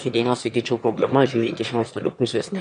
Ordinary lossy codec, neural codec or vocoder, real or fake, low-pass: MP3, 64 kbps; autoencoder, 22.05 kHz, a latent of 192 numbers a frame, VITS, trained on one speaker; fake; 9.9 kHz